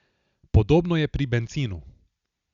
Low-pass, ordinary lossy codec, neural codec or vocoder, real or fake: 7.2 kHz; Opus, 64 kbps; none; real